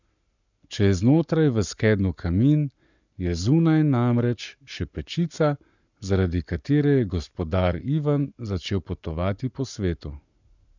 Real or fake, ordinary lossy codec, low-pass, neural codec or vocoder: fake; none; 7.2 kHz; codec, 44.1 kHz, 7.8 kbps, Pupu-Codec